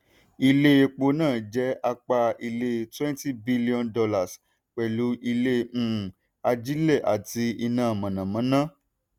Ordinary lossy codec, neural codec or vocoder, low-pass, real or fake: none; none; 19.8 kHz; real